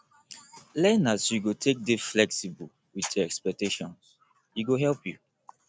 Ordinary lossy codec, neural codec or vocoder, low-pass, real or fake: none; none; none; real